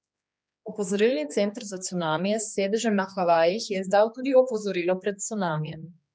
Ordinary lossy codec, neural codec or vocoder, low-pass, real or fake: none; codec, 16 kHz, 2 kbps, X-Codec, HuBERT features, trained on general audio; none; fake